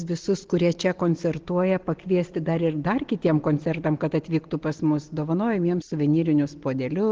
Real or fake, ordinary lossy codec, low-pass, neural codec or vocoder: real; Opus, 16 kbps; 7.2 kHz; none